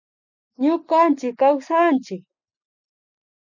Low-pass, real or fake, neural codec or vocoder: 7.2 kHz; real; none